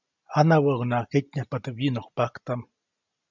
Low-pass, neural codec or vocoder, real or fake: 7.2 kHz; none; real